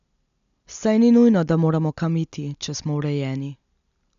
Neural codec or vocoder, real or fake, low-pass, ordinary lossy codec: none; real; 7.2 kHz; none